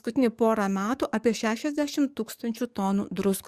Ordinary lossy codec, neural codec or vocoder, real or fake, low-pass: Opus, 64 kbps; codec, 44.1 kHz, 7.8 kbps, DAC; fake; 14.4 kHz